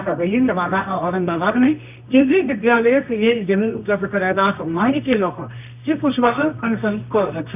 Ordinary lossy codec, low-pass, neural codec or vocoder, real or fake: none; 3.6 kHz; codec, 24 kHz, 0.9 kbps, WavTokenizer, medium music audio release; fake